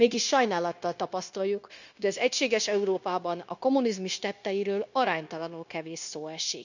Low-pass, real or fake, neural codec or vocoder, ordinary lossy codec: 7.2 kHz; fake; codec, 16 kHz, 0.9 kbps, LongCat-Audio-Codec; none